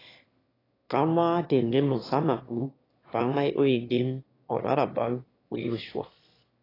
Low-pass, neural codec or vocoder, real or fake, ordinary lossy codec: 5.4 kHz; autoencoder, 22.05 kHz, a latent of 192 numbers a frame, VITS, trained on one speaker; fake; AAC, 24 kbps